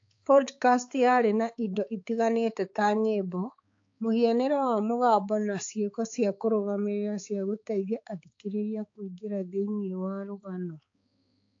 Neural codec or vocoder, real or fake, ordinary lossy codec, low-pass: codec, 16 kHz, 4 kbps, X-Codec, HuBERT features, trained on balanced general audio; fake; AAC, 48 kbps; 7.2 kHz